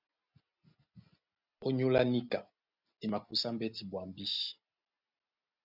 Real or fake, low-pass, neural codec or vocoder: real; 5.4 kHz; none